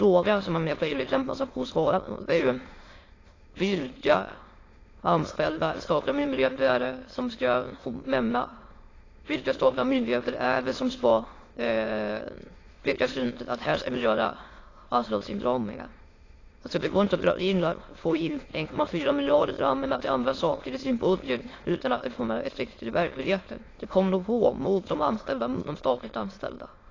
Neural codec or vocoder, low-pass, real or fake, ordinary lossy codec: autoencoder, 22.05 kHz, a latent of 192 numbers a frame, VITS, trained on many speakers; 7.2 kHz; fake; AAC, 32 kbps